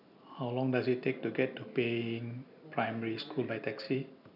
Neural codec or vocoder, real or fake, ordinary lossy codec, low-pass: none; real; none; 5.4 kHz